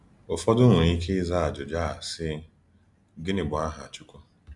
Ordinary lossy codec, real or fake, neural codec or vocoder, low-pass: none; real; none; 10.8 kHz